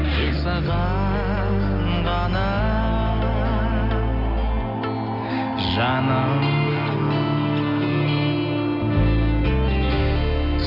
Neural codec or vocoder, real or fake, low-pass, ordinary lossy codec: none; real; 5.4 kHz; none